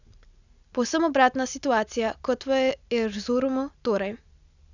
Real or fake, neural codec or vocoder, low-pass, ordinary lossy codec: real; none; 7.2 kHz; none